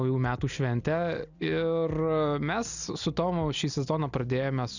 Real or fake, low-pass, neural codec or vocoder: real; 7.2 kHz; none